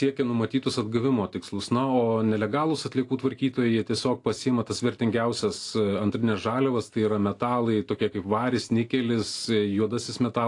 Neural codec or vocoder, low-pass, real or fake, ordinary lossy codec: none; 10.8 kHz; real; AAC, 48 kbps